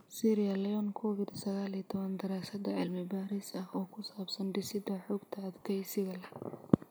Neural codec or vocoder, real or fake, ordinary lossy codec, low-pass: none; real; none; none